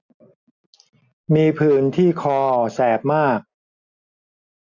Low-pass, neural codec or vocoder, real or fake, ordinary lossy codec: 7.2 kHz; none; real; none